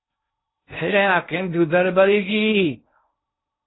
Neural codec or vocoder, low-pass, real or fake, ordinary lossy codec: codec, 16 kHz in and 24 kHz out, 0.6 kbps, FocalCodec, streaming, 2048 codes; 7.2 kHz; fake; AAC, 16 kbps